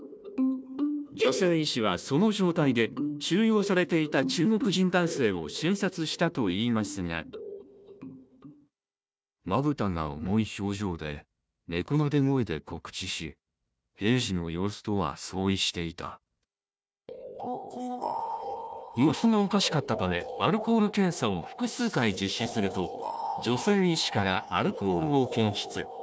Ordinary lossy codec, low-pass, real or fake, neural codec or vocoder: none; none; fake; codec, 16 kHz, 1 kbps, FunCodec, trained on Chinese and English, 50 frames a second